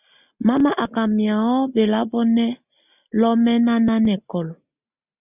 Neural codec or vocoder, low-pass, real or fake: none; 3.6 kHz; real